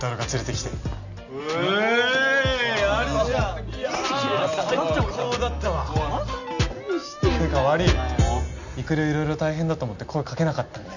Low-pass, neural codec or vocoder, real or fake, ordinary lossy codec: 7.2 kHz; none; real; none